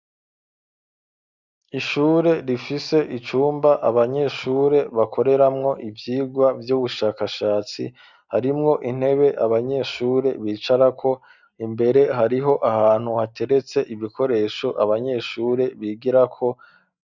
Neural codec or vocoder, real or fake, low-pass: none; real; 7.2 kHz